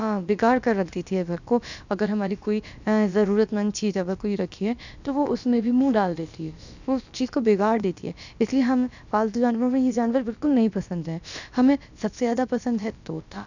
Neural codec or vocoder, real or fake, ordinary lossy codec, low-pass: codec, 16 kHz, about 1 kbps, DyCAST, with the encoder's durations; fake; none; 7.2 kHz